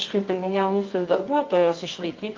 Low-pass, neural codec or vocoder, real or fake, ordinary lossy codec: 7.2 kHz; codec, 24 kHz, 0.9 kbps, WavTokenizer, medium music audio release; fake; Opus, 24 kbps